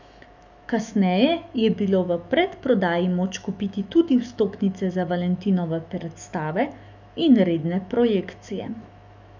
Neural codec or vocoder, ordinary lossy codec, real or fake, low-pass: autoencoder, 48 kHz, 128 numbers a frame, DAC-VAE, trained on Japanese speech; none; fake; 7.2 kHz